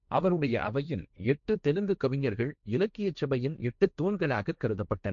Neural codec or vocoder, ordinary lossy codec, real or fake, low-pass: codec, 16 kHz, 1.1 kbps, Voila-Tokenizer; none; fake; 7.2 kHz